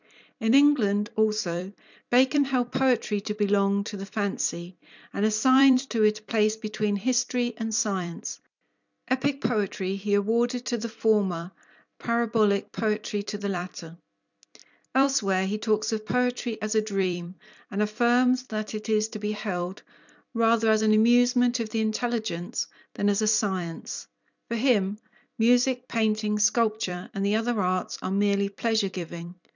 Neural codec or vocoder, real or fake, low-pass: vocoder, 44.1 kHz, 128 mel bands every 256 samples, BigVGAN v2; fake; 7.2 kHz